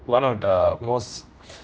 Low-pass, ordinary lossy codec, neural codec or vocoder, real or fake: none; none; codec, 16 kHz, 1 kbps, X-Codec, HuBERT features, trained on general audio; fake